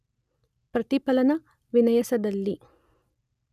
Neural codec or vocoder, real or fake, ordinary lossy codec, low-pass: none; real; none; 14.4 kHz